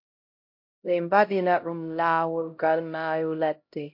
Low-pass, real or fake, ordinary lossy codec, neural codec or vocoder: 5.4 kHz; fake; MP3, 32 kbps; codec, 16 kHz, 0.5 kbps, X-Codec, HuBERT features, trained on LibriSpeech